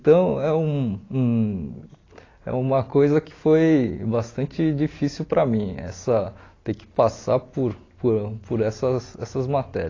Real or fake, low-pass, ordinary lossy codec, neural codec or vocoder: real; 7.2 kHz; AAC, 32 kbps; none